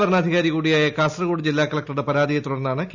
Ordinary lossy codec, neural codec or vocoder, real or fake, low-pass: none; none; real; 7.2 kHz